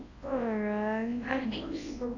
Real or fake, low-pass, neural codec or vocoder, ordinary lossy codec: fake; 7.2 kHz; codec, 24 kHz, 0.9 kbps, WavTokenizer, large speech release; AAC, 32 kbps